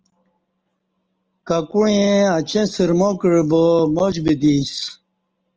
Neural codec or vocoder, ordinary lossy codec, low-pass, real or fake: none; Opus, 24 kbps; 7.2 kHz; real